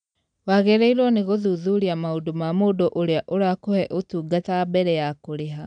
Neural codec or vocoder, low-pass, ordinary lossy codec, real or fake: none; 9.9 kHz; none; real